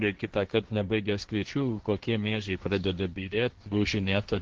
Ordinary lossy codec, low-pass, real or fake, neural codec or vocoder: Opus, 16 kbps; 7.2 kHz; fake; codec, 16 kHz, 1.1 kbps, Voila-Tokenizer